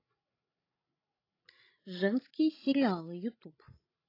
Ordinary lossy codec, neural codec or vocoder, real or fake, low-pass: AAC, 24 kbps; codec, 16 kHz, 16 kbps, FreqCodec, larger model; fake; 5.4 kHz